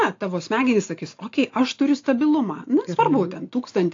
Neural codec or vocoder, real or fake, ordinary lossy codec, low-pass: none; real; AAC, 48 kbps; 7.2 kHz